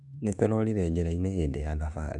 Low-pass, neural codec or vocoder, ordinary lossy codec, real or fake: 10.8 kHz; autoencoder, 48 kHz, 32 numbers a frame, DAC-VAE, trained on Japanese speech; none; fake